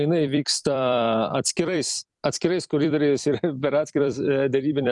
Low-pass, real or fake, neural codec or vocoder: 10.8 kHz; fake; vocoder, 44.1 kHz, 128 mel bands every 256 samples, BigVGAN v2